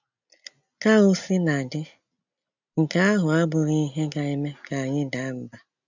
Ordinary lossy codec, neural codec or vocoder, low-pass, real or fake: none; none; 7.2 kHz; real